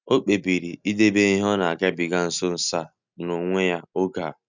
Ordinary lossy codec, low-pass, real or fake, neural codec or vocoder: none; 7.2 kHz; real; none